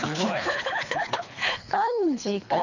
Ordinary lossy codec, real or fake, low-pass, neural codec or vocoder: none; fake; 7.2 kHz; codec, 24 kHz, 3 kbps, HILCodec